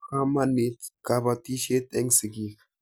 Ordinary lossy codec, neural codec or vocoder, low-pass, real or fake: none; none; none; real